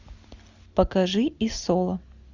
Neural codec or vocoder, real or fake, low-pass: none; real; 7.2 kHz